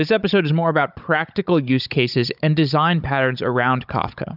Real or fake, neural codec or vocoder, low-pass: fake; codec, 16 kHz, 16 kbps, FunCodec, trained on Chinese and English, 50 frames a second; 5.4 kHz